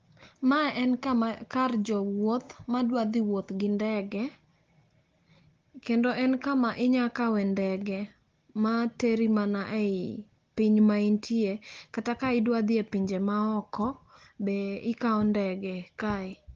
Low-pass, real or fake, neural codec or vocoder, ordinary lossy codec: 7.2 kHz; real; none; Opus, 16 kbps